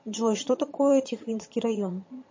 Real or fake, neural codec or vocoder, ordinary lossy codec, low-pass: fake; vocoder, 22.05 kHz, 80 mel bands, HiFi-GAN; MP3, 32 kbps; 7.2 kHz